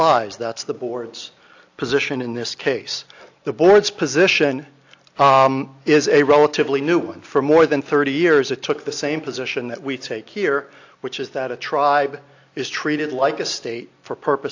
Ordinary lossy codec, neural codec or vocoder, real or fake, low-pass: AAC, 48 kbps; none; real; 7.2 kHz